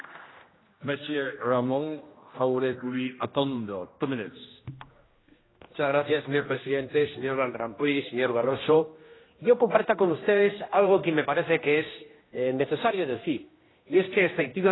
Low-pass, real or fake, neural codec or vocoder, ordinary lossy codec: 7.2 kHz; fake; codec, 16 kHz, 1 kbps, X-Codec, HuBERT features, trained on general audio; AAC, 16 kbps